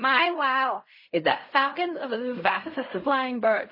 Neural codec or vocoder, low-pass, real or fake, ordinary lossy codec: codec, 16 kHz in and 24 kHz out, 0.4 kbps, LongCat-Audio-Codec, fine tuned four codebook decoder; 5.4 kHz; fake; MP3, 24 kbps